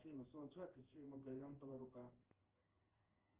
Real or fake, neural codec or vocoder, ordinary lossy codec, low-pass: fake; codec, 16 kHz in and 24 kHz out, 1 kbps, XY-Tokenizer; Opus, 16 kbps; 3.6 kHz